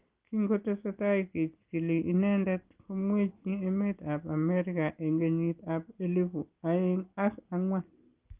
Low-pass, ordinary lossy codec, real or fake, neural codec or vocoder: 3.6 kHz; Opus, 32 kbps; real; none